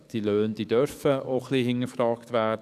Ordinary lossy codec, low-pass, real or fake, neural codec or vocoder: none; 14.4 kHz; fake; codec, 44.1 kHz, 7.8 kbps, DAC